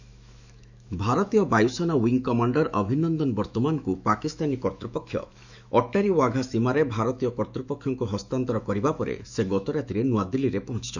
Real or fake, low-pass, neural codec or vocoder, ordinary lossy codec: fake; 7.2 kHz; autoencoder, 48 kHz, 128 numbers a frame, DAC-VAE, trained on Japanese speech; none